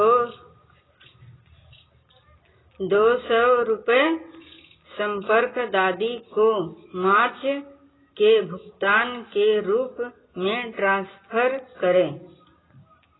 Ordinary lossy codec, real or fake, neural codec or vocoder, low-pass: AAC, 16 kbps; real; none; 7.2 kHz